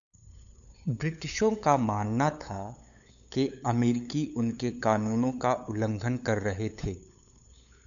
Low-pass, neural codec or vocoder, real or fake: 7.2 kHz; codec, 16 kHz, 8 kbps, FunCodec, trained on LibriTTS, 25 frames a second; fake